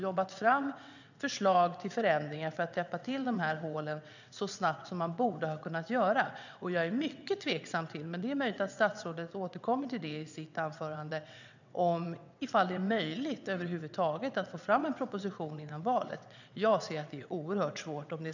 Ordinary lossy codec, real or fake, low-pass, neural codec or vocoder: none; fake; 7.2 kHz; vocoder, 22.05 kHz, 80 mel bands, WaveNeXt